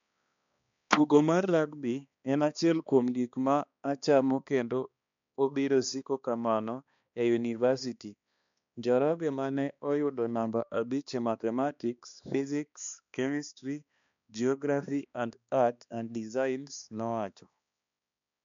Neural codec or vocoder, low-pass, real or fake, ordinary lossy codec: codec, 16 kHz, 2 kbps, X-Codec, HuBERT features, trained on balanced general audio; 7.2 kHz; fake; MP3, 64 kbps